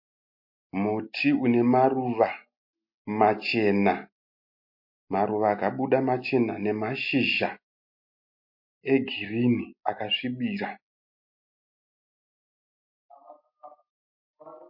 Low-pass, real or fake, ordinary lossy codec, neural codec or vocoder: 5.4 kHz; real; MP3, 32 kbps; none